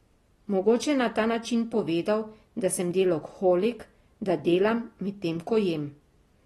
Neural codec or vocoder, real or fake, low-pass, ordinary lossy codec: none; real; 19.8 kHz; AAC, 32 kbps